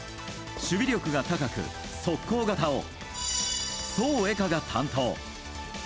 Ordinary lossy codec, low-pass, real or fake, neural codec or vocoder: none; none; real; none